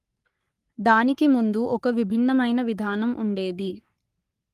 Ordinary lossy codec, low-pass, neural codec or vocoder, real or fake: Opus, 32 kbps; 14.4 kHz; codec, 44.1 kHz, 3.4 kbps, Pupu-Codec; fake